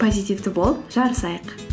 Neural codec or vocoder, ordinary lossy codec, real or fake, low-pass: none; none; real; none